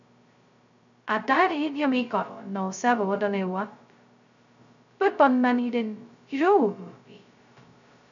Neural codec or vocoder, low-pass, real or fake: codec, 16 kHz, 0.2 kbps, FocalCodec; 7.2 kHz; fake